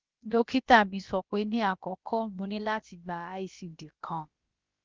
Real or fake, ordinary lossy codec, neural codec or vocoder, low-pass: fake; Opus, 32 kbps; codec, 16 kHz, about 1 kbps, DyCAST, with the encoder's durations; 7.2 kHz